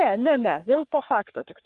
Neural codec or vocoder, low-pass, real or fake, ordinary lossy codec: codec, 44.1 kHz, 3.4 kbps, Pupu-Codec; 10.8 kHz; fake; Opus, 32 kbps